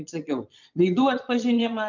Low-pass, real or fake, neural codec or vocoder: 7.2 kHz; fake; vocoder, 22.05 kHz, 80 mel bands, Vocos